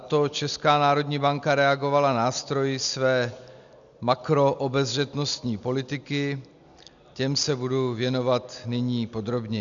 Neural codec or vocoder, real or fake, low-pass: none; real; 7.2 kHz